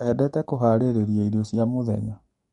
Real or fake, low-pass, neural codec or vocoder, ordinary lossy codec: fake; 19.8 kHz; codec, 44.1 kHz, 7.8 kbps, DAC; MP3, 48 kbps